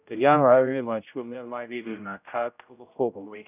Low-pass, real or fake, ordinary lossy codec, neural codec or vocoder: 3.6 kHz; fake; none; codec, 16 kHz, 0.5 kbps, X-Codec, HuBERT features, trained on general audio